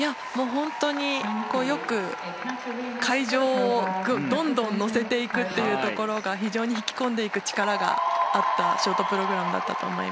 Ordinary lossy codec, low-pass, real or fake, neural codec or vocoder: none; none; real; none